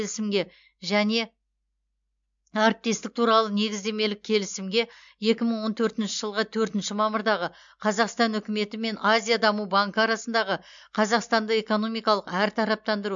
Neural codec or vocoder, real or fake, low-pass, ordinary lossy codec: none; real; 7.2 kHz; MP3, 64 kbps